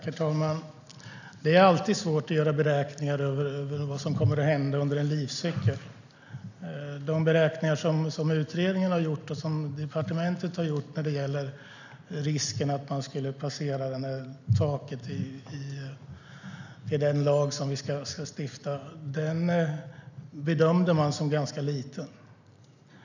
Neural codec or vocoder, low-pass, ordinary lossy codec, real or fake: none; 7.2 kHz; none; real